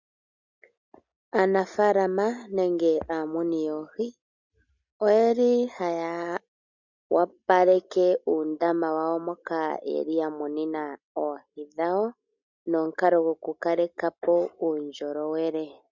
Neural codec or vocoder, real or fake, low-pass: none; real; 7.2 kHz